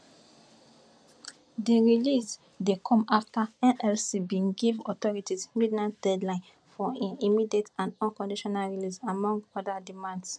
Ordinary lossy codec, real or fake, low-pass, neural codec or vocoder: none; real; none; none